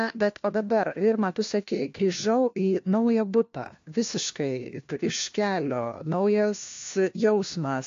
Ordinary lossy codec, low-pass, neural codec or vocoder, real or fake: AAC, 48 kbps; 7.2 kHz; codec, 16 kHz, 1 kbps, FunCodec, trained on LibriTTS, 50 frames a second; fake